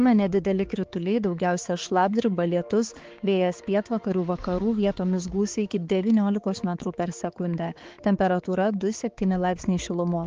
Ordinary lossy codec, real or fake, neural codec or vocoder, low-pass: Opus, 16 kbps; fake; codec, 16 kHz, 4 kbps, X-Codec, HuBERT features, trained on balanced general audio; 7.2 kHz